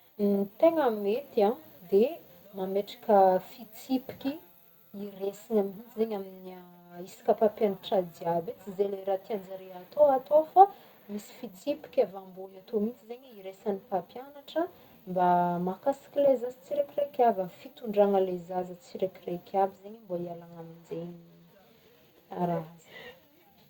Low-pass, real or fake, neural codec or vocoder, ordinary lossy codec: 19.8 kHz; real; none; Opus, 64 kbps